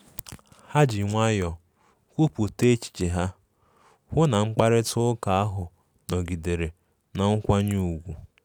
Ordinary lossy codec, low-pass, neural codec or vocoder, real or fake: none; none; none; real